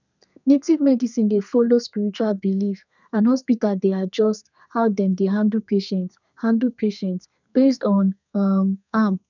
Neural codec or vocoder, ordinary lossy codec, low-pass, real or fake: codec, 32 kHz, 1.9 kbps, SNAC; none; 7.2 kHz; fake